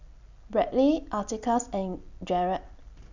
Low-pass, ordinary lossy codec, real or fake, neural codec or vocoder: 7.2 kHz; none; real; none